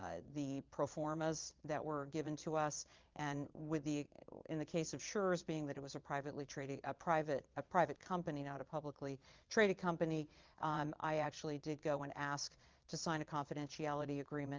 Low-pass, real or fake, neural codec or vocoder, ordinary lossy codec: 7.2 kHz; fake; vocoder, 22.05 kHz, 80 mel bands, Vocos; Opus, 24 kbps